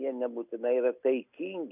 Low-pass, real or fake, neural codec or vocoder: 3.6 kHz; real; none